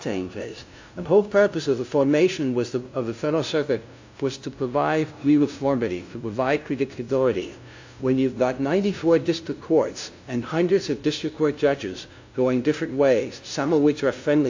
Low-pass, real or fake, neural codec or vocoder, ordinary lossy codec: 7.2 kHz; fake; codec, 16 kHz, 0.5 kbps, FunCodec, trained on LibriTTS, 25 frames a second; AAC, 48 kbps